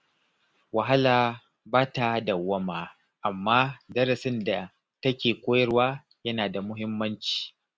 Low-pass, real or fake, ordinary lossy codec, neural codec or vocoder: none; real; none; none